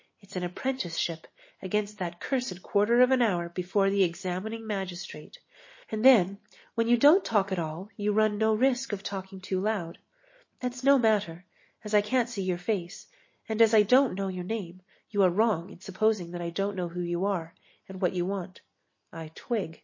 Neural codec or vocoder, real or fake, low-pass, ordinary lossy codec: none; real; 7.2 kHz; MP3, 32 kbps